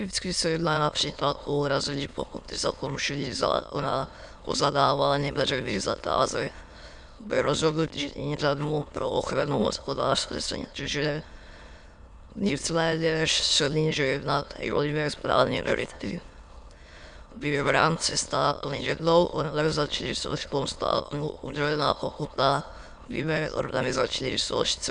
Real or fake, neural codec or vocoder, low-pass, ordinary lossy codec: fake; autoencoder, 22.05 kHz, a latent of 192 numbers a frame, VITS, trained on many speakers; 9.9 kHz; MP3, 96 kbps